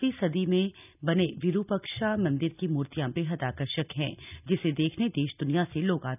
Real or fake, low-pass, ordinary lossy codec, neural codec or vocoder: real; 3.6 kHz; none; none